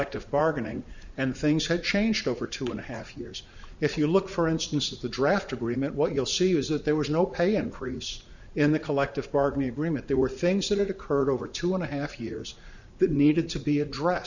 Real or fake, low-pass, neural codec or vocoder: fake; 7.2 kHz; vocoder, 44.1 kHz, 80 mel bands, Vocos